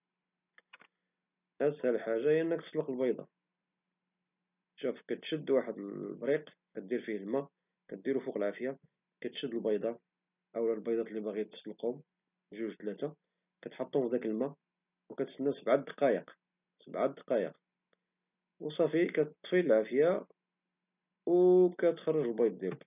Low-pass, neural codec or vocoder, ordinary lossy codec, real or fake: 3.6 kHz; none; none; real